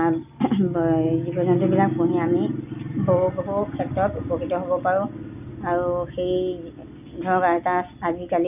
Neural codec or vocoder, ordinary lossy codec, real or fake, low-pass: none; none; real; 3.6 kHz